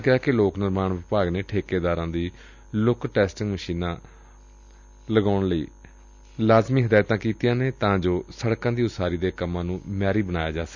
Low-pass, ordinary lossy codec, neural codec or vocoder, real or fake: 7.2 kHz; none; none; real